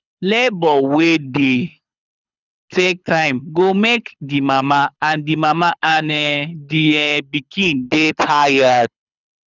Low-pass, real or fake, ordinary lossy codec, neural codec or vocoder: 7.2 kHz; fake; none; codec, 24 kHz, 6 kbps, HILCodec